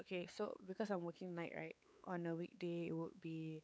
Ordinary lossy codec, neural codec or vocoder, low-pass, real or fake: none; codec, 16 kHz, 4 kbps, X-Codec, WavLM features, trained on Multilingual LibriSpeech; none; fake